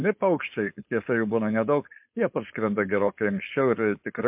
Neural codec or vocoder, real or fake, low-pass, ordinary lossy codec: codec, 16 kHz, 4.8 kbps, FACodec; fake; 3.6 kHz; MP3, 32 kbps